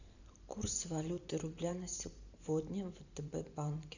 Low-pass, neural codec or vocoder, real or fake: 7.2 kHz; vocoder, 44.1 kHz, 128 mel bands every 512 samples, BigVGAN v2; fake